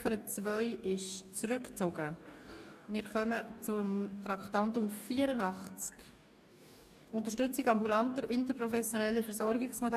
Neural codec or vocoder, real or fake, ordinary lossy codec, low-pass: codec, 44.1 kHz, 2.6 kbps, DAC; fake; none; 14.4 kHz